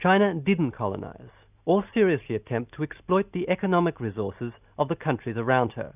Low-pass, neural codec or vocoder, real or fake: 3.6 kHz; none; real